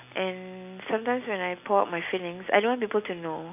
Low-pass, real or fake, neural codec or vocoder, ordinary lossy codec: 3.6 kHz; real; none; none